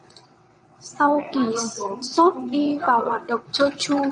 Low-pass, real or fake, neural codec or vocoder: 9.9 kHz; fake; vocoder, 22.05 kHz, 80 mel bands, WaveNeXt